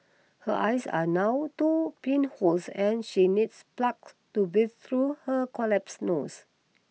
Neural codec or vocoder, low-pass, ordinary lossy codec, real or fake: none; none; none; real